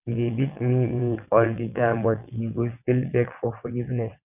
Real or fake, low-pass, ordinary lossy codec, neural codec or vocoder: fake; 3.6 kHz; none; vocoder, 22.05 kHz, 80 mel bands, WaveNeXt